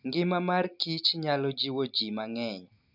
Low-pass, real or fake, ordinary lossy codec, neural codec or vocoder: 5.4 kHz; real; none; none